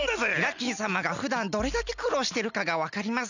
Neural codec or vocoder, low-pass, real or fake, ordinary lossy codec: none; 7.2 kHz; real; none